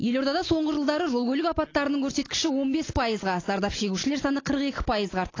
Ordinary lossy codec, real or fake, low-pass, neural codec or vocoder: AAC, 32 kbps; real; 7.2 kHz; none